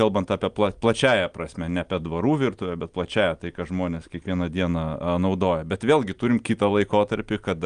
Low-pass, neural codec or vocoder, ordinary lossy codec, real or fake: 9.9 kHz; none; Opus, 32 kbps; real